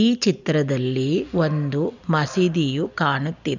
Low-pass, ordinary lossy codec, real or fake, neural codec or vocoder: 7.2 kHz; none; real; none